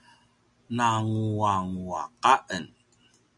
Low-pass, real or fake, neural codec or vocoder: 10.8 kHz; real; none